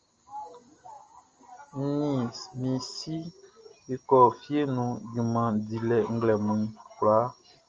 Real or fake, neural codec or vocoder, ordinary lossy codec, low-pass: real; none; Opus, 32 kbps; 7.2 kHz